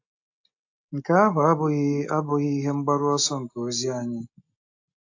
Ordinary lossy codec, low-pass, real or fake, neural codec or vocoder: AAC, 48 kbps; 7.2 kHz; real; none